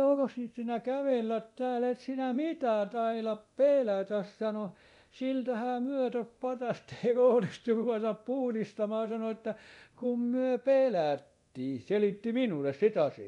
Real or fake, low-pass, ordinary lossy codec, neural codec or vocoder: fake; 10.8 kHz; none; codec, 24 kHz, 0.9 kbps, DualCodec